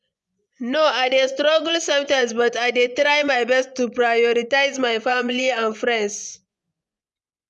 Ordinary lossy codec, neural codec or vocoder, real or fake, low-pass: none; vocoder, 24 kHz, 100 mel bands, Vocos; fake; none